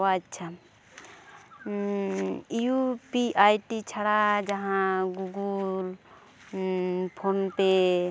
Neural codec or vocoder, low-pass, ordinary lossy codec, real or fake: none; none; none; real